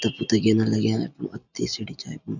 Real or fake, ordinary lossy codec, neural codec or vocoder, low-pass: real; none; none; 7.2 kHz